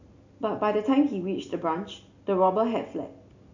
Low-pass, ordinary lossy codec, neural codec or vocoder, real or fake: 7.2 kHz; none; none; real